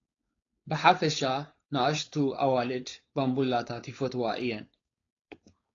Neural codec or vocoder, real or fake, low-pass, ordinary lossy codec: codec, 16 kHz, 4.8 kbps, FACodec; fake; 7.2 kHz; AAC, 32 kbps